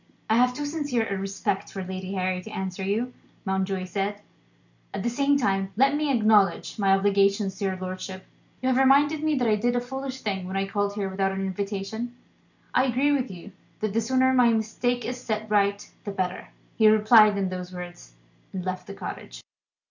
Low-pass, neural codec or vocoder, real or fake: 7.2 kHz; none; real